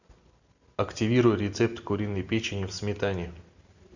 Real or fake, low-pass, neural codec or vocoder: real; 7.2 kHz; none